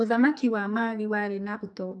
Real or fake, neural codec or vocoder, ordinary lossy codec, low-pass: fake; codec, 44.1 kHz, 2.6 kbps, SNAC; AAC, 64 kbps; 10.8 kHz